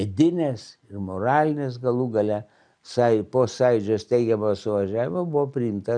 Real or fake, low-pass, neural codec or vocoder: real; 9.9 kHz; none